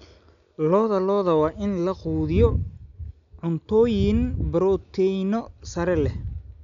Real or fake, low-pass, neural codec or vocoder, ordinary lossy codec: real; 7.2 kHz; none; none